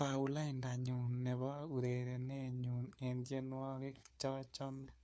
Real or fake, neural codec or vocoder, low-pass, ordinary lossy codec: fake; codec, 16 kHz, 4 kbps, FunCodec, trained on LibriTTS, 50 frames a second; none; none